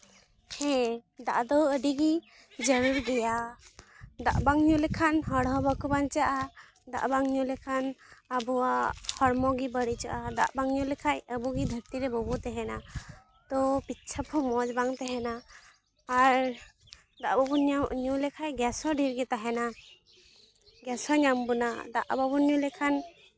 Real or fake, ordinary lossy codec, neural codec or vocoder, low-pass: real; none; none; none